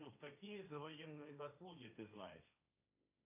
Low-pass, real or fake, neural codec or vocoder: 3.6 kHz; fake; codec, 16 kHz, 1.1 kbps, Voila-Tokenizer